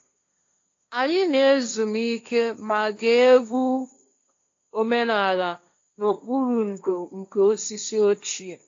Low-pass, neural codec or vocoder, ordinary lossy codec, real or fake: 7.2 kHz; codec, 16 kHz, 1.1 kbps, Voila-Tokenizer; AAC, 48 kbps; fake